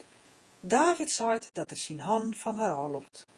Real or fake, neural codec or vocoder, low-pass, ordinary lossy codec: fake; vocoder, 48 kHz, 128 mel bands, Vocos; 10.8 kHz; Opus, 32 kbps